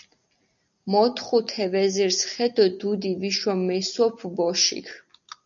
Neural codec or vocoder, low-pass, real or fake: none; 7.2 kHz; real